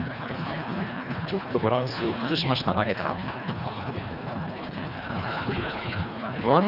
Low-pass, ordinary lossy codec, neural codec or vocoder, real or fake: 5.4 kHz; none; codec, 24 kHz, 1.5 kbps, HILCodec; fake